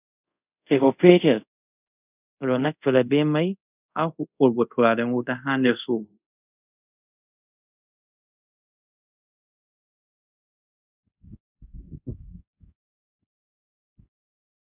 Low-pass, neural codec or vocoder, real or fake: 3.6 kHz; codec, 24 kHz, 0.5 kbps, DualCodec; fake